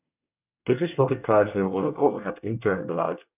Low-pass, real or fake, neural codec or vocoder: 3.6 kHz; fake; codec, 24 kHz, 1 kbps, SNAC